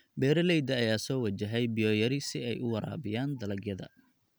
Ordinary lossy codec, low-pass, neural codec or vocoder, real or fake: none; none; none; real